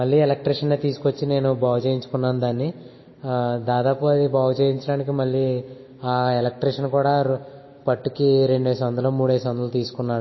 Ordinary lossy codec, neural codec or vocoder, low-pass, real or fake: MP3, 24 kbps; none; 7.2 kHz; real